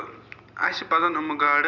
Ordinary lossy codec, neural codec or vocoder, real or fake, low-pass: none; none; real; 7.2 kHz